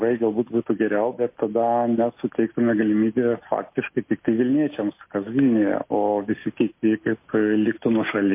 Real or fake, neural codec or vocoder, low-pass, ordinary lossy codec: real; none; 3.6 kHz; MP3, 24 kbps